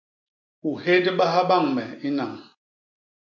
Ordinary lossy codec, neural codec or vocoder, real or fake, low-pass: MP3, 48 kbps; none; real; 7.2 kHz